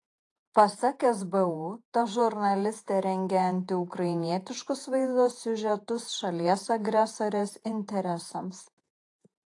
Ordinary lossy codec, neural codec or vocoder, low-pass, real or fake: AAC, 48 kbps; vocoder, 48 kHz, 128 mel bands, Vocos; 10.8 kHz; fake